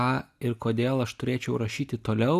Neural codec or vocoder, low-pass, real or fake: none; 14.4 kHz; real